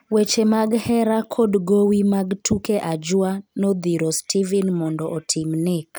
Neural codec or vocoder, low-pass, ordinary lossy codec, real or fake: none; none; none; real